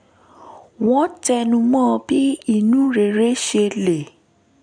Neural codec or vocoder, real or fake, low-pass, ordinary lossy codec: none; real; 9.9 kHz; none